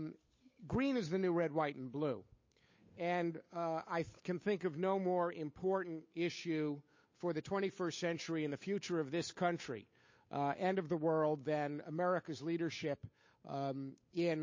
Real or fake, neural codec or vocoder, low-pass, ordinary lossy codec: fake; codec, 16 kHz, 8 kbps, FunCodec, trained on Chinese and English, 25 frames a second; 7.2 kHz; MP3, 32 kbps